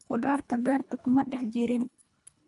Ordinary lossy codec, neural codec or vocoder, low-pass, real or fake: none; codec, 24 kHz, 1.5 kbps, HILCodec; 10.8 kHz; fake